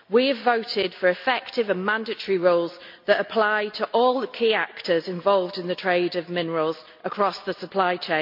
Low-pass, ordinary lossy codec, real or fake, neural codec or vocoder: 5.4 kHz; MP3, 48 kbps; real; none